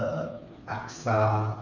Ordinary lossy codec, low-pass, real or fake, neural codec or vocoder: none; 7.2 kHz; fake; codec, 16 kHz, 4 kbps, FreqCodec, smaller model